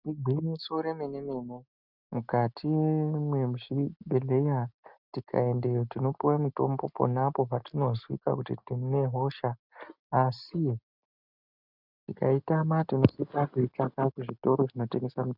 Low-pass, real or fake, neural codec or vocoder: 5.4 kHz; real; none